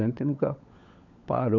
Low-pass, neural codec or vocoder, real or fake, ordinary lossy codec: 7.2 kHz; codec, 16 kHz, 16 kbps, FunCodec, trained on LibriTTS, 50 frames a second; fake; none